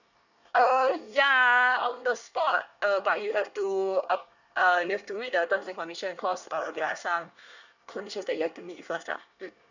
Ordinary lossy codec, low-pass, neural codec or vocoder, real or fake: none; 7.2 kHz; codec, 24 kHz, 1 kbps, SNAC; fake